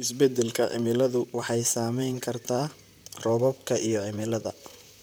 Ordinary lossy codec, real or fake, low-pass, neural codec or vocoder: none; fake; none; vocoder, 44.1 kHz, 128 mel bands, Pupu-Vocoder